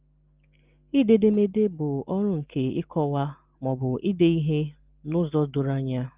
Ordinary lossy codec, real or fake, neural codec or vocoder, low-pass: Opus, 32 kbps; real; none; 3.6 kHz